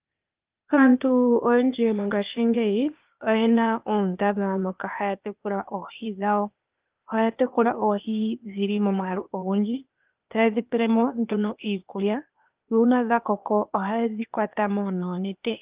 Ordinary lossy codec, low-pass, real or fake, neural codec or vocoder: Opus, 32 kbps; 3.6 kHz; fake; codec, 16 kHz, 0.8 kbps, ZipCodec